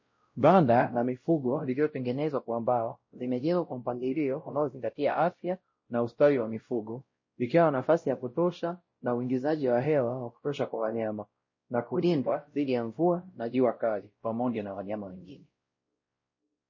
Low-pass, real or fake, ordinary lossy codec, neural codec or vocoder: 7.2 kHz; fake; MP3, 32 kbps; codec, 16 kHz, 0.5 kbps, X-Codec, WavLM features, trained on Multilingual LibriSpeech